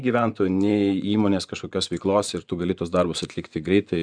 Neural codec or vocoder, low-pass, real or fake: vocoder, 24 kHz, 100 mel bands, Vocos; 9.9 kHz; fake